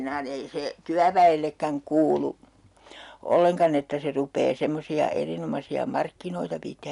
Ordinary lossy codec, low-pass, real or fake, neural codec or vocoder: none; 10.8 kHz; real; none